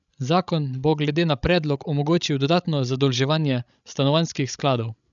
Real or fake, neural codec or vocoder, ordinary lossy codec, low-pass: fake; codec, 16 kHz, 16 kbps, FreqCodec, larger model; none; 7.2 kHz